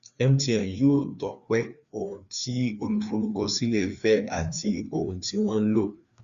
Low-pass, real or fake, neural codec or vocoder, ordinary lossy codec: 7.2 kHz; fake; codec, 16 kHz, 2 kbps, FreqCodec, larger model; Opus, 64 kbps